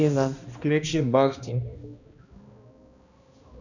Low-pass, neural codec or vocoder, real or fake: 7.2 kHz; codec, 16 kHz, 1 kbps, X-Codec, HuBERT features, trained on balanced general audio; fake